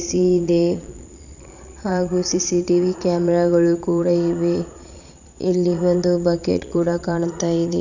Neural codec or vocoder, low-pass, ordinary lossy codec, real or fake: vocoder, 22.05 kHz, 80 mel bands, Vocos; 7.2 kHz; none; fake